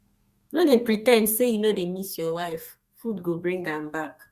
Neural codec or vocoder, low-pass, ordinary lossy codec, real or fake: codec, 32 kHz, 1.9 kbps, SNAC; 14.4 kHz; Opus, 64 kbps; fake